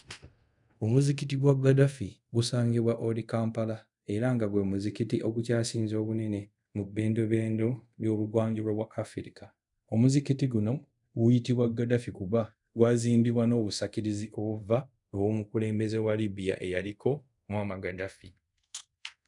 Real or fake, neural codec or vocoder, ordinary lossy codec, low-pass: fake; codec, 24 kHz, 0.5 kbps, DualCodec; none; 10.8 kHz